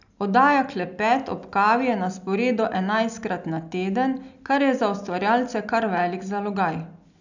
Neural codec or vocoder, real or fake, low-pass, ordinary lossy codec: none; real; 7.2 kHz; none